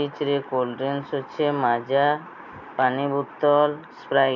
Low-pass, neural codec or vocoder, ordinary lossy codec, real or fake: 7.2 kHz; none; none; real